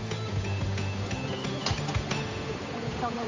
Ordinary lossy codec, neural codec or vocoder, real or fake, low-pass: AAC, 48 kbps; codec, 16 kHz, 8 kbps, FunCodec, trained on Chinese and English, 25 frames a second; fake; 7.2 kHz